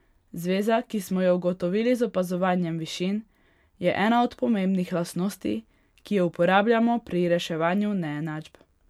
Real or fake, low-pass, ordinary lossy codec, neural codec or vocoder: real; 19.8 kHz; MP3, 96 kbps; none